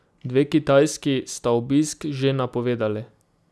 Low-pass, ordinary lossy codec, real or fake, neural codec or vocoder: none; none; real; none